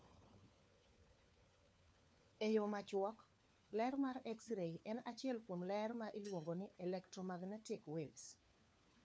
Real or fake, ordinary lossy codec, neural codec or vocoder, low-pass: fake; none; codec, 16 kHz, 4 kbps, FunCodec, trained on LibriTTS, 50 frames a second; none